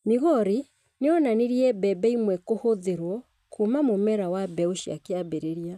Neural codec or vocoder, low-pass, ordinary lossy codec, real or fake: none; 14.4 kHz; none; real